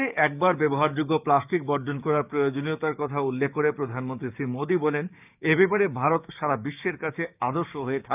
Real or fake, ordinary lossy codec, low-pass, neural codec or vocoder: fake; none; 3.6 kHz; codec, 16 kHz, 6 kbps, DAC